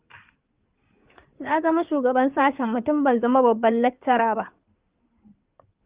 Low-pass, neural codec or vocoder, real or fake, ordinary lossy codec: 3.6 kHz; codec, 16 kHz in and 24 kHz out, 2.2 kbps, FireRedTTS-2 codec; fake; Opus, 24 kbps